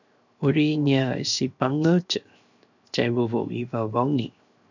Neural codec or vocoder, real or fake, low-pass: codec, 16 kHz, 0.7 kbps, FocalCodec; fake; 7.2 kHz